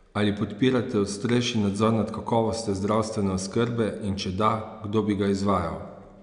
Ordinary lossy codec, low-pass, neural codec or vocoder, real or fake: none; 9.9 kHz; none; real